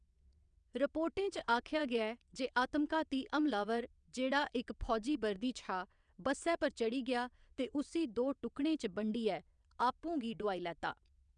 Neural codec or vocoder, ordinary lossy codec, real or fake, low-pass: vocoder, 22.05 kHz, 80 mel bands, WaveNeXt; none; fake; 9.9 kHz